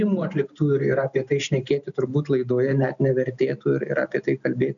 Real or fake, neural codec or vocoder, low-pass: real; none; 7.2 kHz